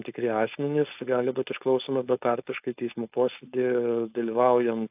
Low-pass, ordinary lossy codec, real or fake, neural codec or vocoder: 3.6 kHz; AAC, 32 kbps; fake; codec, 16 kHz, 4.8 kbps, FACodec